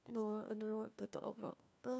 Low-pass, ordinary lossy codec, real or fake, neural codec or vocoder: none; none; fake; codec, 16 kHz, 1 kbps, FunCodec, trained on LibriTTS, 50 frames a second